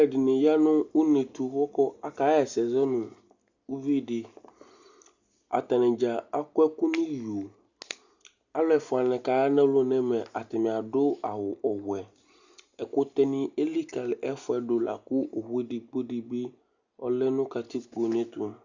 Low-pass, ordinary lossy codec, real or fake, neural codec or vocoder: 7.2 kHz; Opus, 64 kbps; real; none